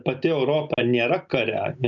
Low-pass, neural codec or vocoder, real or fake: 7.2 kHz; none; real